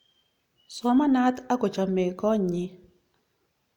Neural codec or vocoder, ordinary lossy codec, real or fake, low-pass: none; none; real; 19.8 kHz